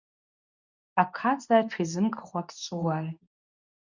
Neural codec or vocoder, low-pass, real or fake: codec, 24 kHz, 0.9 kbps, WavTokenizer, medium speech release version 2; 7.2 kHz; fake